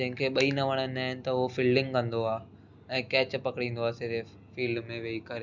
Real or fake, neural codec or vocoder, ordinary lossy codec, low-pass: real; none; none; 7.2 kHz